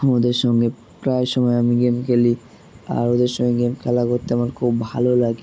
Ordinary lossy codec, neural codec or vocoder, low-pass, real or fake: none; none; none; real